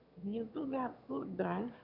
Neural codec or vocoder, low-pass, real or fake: autoencoder, 22.05 kHz, a latent of 192 numbers a frame, VITS, trained on one speaker; 5.4 kHz; fake